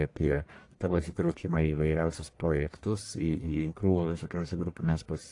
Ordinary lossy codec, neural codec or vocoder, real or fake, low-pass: AAC, 48 kbps; codec, 44.1 kHz, 1.7 kbps, Pupu-Codec; fake; 10.8 kHz